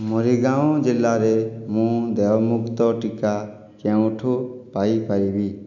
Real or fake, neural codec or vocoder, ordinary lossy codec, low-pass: real; none; none; 7.2 kHz